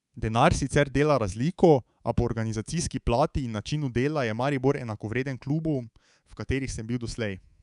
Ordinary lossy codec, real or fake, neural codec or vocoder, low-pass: none; fake; codec, 24 kHz, 3.1 kbps, DualCodec; 10.8 kHz